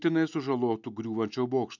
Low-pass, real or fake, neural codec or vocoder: 7.2 kHz; real; none